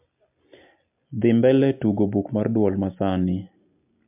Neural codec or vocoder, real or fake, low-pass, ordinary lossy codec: none; real; 3.6 kHz; MP3, 32 kbps